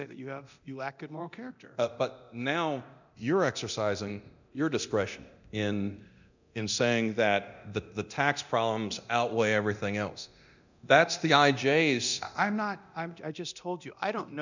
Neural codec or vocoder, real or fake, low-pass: codec, 24 kHz, 0.9 kbps, DualCodec; fake; 7.2 kHz